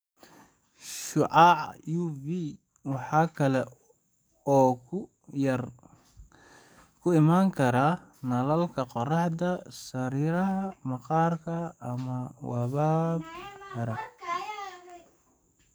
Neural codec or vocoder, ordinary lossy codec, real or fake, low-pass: codec, 44.1 kHz, 7.8 kbps, DAC; none; fake; none